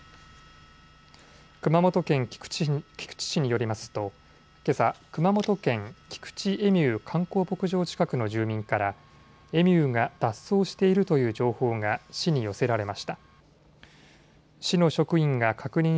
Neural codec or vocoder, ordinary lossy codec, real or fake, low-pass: none; none; real; none